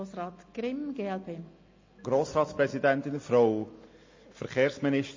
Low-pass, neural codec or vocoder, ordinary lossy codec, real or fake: 7.2 kHz; none; MP3, 32 kbps; real